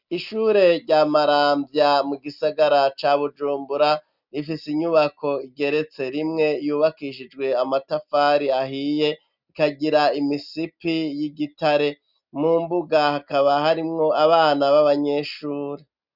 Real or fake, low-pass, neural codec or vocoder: real; 5.4 kHz; none